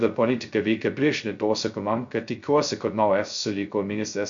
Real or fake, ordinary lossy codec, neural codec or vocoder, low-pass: fake; MP3, 64 kbps; codec, 16 kHz, 0.2 kbps, FocalCodec; 7.2 kHz